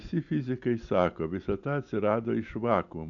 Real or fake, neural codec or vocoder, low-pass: real; none; 7.2 kHz